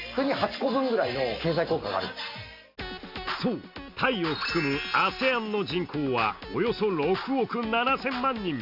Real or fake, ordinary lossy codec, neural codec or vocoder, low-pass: real; none; none; 5.4 kHz